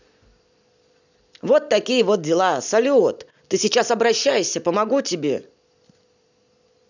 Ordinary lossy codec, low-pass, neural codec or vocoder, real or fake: none; 7.2 kHz; none; real